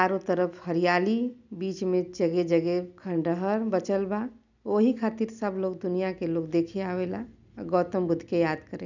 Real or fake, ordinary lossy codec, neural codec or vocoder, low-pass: real; none; none; 7.2 kHz